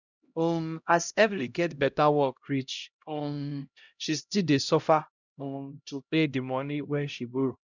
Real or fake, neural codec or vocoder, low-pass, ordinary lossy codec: fake; codec, 16 kHz, 0.5 kbps, X-Codec, HuBERT features, trained on LibriSpeech; 7.2 kHz; none